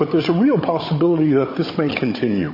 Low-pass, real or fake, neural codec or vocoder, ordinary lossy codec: 5.4 kHz; fake; codec, 16 kHz, 16 kbps, FunCodec, trained on Chinese and English, 50 frames a second; MP3, 24 kbps